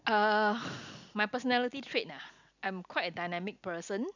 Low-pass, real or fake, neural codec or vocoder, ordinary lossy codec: 7.2 kHz; real; none; none